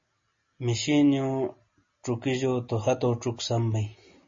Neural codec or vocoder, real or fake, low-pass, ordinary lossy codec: none; real; 7.2 kHz; MP3, 32 kbps